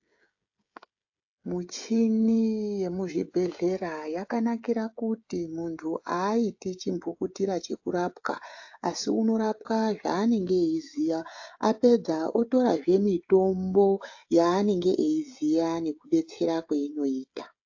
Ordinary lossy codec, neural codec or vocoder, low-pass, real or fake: AAC, 48 kbps; codec, 16 kHz, 16 kbps, FreqCodec, smaller model; 7.2 kHz; fake